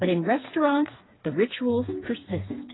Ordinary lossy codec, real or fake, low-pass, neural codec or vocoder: AAC, 16 kbps; fake; 7.2 kHz; codec, 16 kHz, 4 kbps, FreqCodec, smaller model